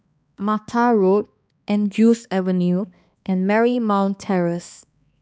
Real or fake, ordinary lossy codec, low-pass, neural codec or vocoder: fake; none; none; codec, 16 kHz, 2 kbps, X-Codec, HuBERT features, trained on balanced general audio